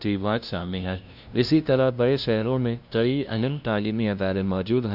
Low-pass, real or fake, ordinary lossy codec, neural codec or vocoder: 5.4 kHz; fake; none; codec, 16 kHz, 0.5 kbps, FunCodec, trained on LibriTTS, 25 frames a second